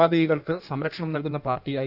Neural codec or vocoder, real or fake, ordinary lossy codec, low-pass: codec, 16 kHz in and 24 kHz out, 1.1 kbps, FireRedTTS-2 codec; fake; none; 5.4 kHz